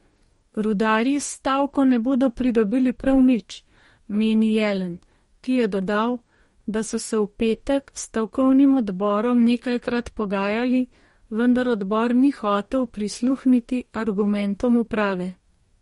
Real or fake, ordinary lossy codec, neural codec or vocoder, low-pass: fake; MP3, 48 kbps; codec, 44.1 kHz, 2.6 kbps, DAC; 19.8 kHz